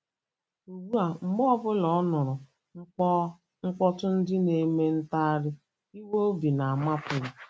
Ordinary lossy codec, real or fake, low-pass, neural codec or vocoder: none; real; none; none